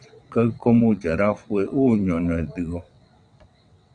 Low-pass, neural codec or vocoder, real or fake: 9.9 kHz; vocoder, 22.05 kHz, 80 mel bands, WaveNeXt; fake